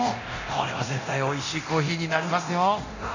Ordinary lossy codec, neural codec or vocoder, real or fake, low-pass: none; codec, 24 kHz, 0.9 kbps, DualCodec; fake; 7.2 kHz